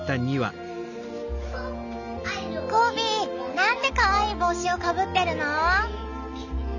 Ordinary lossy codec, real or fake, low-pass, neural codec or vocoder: none; real; 7.2 kHz; none